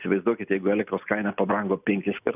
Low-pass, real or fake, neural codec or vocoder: 3.6 kHz; real; none